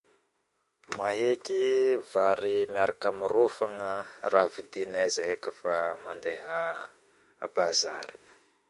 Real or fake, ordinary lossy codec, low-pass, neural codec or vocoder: fake; MP3, 48 kbps; 14.4 kHz; autoencoder, 48 kHz, 32 numbers a frame, DAC-VAE, trained on Japanese speech